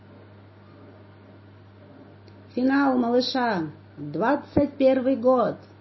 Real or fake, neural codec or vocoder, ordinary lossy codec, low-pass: real; none; MP3, 24 kbps; 7.2 kHz